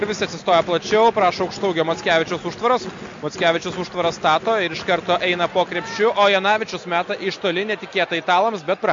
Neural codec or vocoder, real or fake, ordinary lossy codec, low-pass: none; real; MP3, 48 kbps; 7.2 kHz